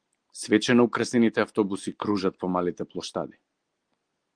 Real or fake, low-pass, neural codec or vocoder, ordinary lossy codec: real; 9.9 kHz; none; Opus, 24 kbps